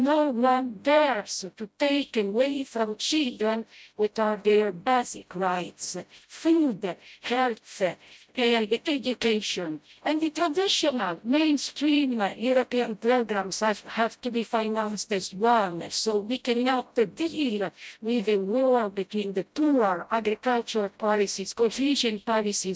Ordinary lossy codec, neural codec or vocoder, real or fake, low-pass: none; codec, 16 kHz, 0.5 kbps, FreqCodec, smaller model; fake; none